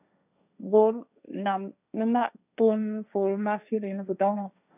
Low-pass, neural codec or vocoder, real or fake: 3.6 kHz; codec, 16 kHz, 1.1 kbps, Voila-Tokenizer; fake